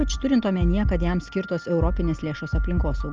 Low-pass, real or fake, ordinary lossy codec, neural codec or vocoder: 7.2 kHz; real; Opus, 32 kbps; none